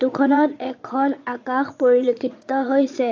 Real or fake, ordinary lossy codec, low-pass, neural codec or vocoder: fake; AAC, 48 kbps; 7.2 kHz; vocoder, 22.05 kHz, 80 mel bands, Vocos